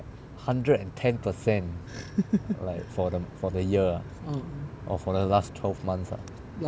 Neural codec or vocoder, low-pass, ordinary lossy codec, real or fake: none; none; none; real